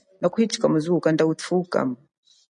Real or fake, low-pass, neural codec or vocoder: real; 9.9 kHz; none